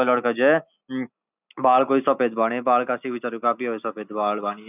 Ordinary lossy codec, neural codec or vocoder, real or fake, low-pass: none; none; real; 3.6 kHz